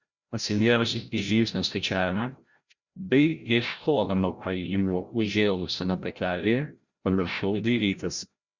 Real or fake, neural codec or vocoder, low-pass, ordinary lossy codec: fake; codec, 16 kHz, 0.5 kbps, FreqCodec, larger model; 7.2 kHz; Opus, 64 kbps